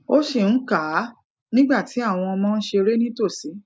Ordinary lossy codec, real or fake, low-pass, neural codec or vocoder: none; real; none; none